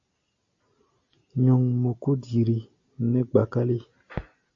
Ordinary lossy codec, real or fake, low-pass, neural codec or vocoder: AAC, 48 kbps; real; 7.2 kHz; none